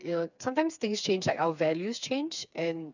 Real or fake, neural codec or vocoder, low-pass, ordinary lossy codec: fake; codec, 16 kHz, 4 kbps, FreqCodec, smaller model; 7.2 kHz; none